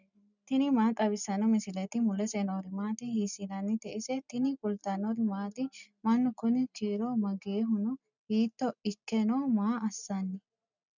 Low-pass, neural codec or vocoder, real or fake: 7.2 kHz; none; real